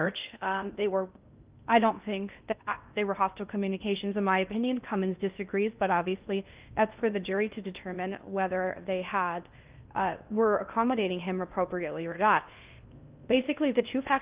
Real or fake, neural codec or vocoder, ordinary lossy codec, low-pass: fake; codec, 16 kHz in and 24 kHz out, 0.6 kbps, FocalCodec, streaming, 2048 codes; Opus, 32 kbps; 3.6 kHz